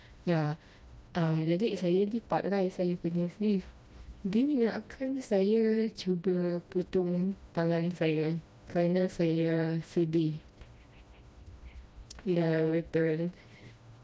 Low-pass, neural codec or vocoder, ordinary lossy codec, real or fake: none; codec, 16 kHz, 1 kbps, FreqCodec, smaller model; none; fake